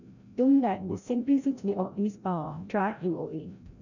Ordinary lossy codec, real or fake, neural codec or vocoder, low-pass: none; fake; codec, 16 kHz, 0.5 kbps, FreqCodec, larger model; 7.2 kHz